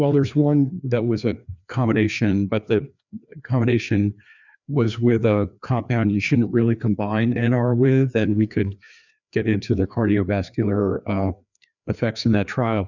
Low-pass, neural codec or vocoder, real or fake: 7.2 kHz; codec, 16 kHz, 2 kbps, FreqCodec, larger model; fake